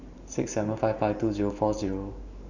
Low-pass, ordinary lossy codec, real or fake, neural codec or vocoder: 7.2 kHz; none; fake; vocoder, 44.1 kHz, 128 mel bands every 512 samples, BigVGAN v2